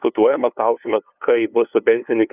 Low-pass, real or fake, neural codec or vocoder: 3.6 kHz; fake; codec, 16 kHz, 2 kbps, FunCodec, trained on LibriTTS, 25 frames a second